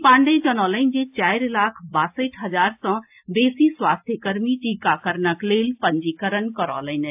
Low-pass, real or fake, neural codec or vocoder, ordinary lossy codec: 3.6 kHz; real; none; AAC, 32 kbps